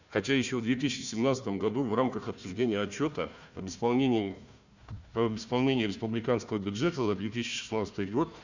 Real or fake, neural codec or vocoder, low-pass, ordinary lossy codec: fake; codec, 16 kHz, 1 kbps, FunCodec, trained on Chinese and English, 50 frames a second; 7.2 kHz; none